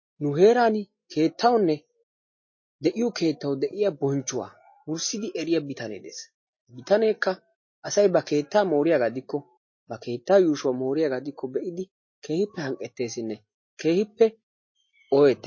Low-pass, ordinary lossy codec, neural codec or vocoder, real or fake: 7.2 kHz; MP3, 32 kbps; none; real